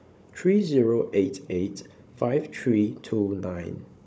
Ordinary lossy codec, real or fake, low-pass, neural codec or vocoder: none; fake; none; codec, 16 kHz, 16 kbps, FunCodec, trained on Chinese and English, 50 frames a second